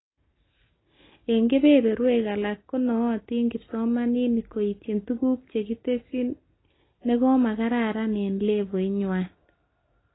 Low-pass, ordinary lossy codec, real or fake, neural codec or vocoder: 7.2 kHz; AAC, 16 kbps; real; none